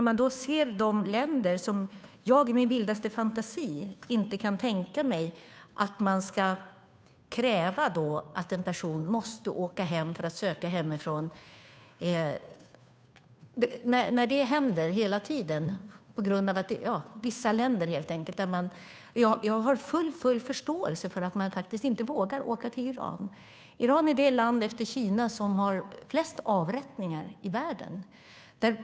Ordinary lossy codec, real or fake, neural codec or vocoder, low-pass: none; fake; codec, 16 kHz, 2 kbps, FunCodec, trained on Chinese and English, 25 frames a second; none